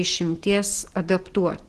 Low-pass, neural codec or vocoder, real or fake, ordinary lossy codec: 10.8 kHz; none; real; Opus, 16 kbps